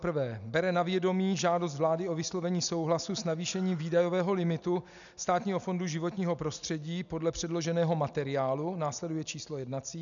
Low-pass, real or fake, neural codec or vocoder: 7.2 kHz; real; none